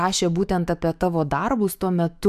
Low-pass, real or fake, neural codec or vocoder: 14.4 kHz; fake; autoencoder, 48 kHz, 128 numbers a frame, DAC-VAE, trained on Japanese speech